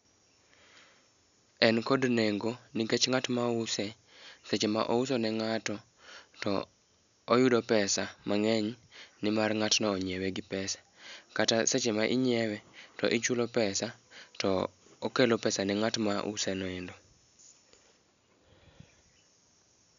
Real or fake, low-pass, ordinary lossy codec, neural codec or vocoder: real; 7.2 kHz; none; none